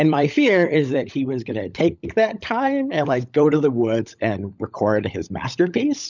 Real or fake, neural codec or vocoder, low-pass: fake; codec, 16 kHz, 16 kbps, FunCodec, trained on LibriTTS, 50 frames a second; 7.2 kHz